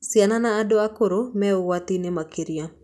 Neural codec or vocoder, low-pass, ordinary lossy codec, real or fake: none; none; none; real